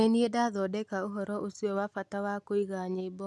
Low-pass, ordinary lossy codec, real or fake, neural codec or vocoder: none; none; real; none